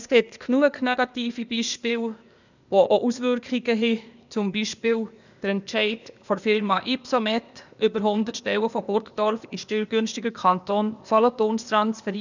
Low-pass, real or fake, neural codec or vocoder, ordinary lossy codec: 7.2 kHz; fake; codec, 16 kHz, 0.8 kbps, ZipCodec; none